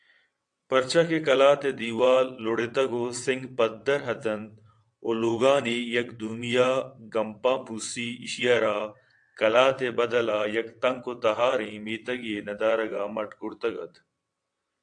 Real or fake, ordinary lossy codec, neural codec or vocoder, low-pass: fake; AAC, 64 kbps; vocoder, 22.05 kHz, 80 mel bands, WaveNeXt; 9.9 kHz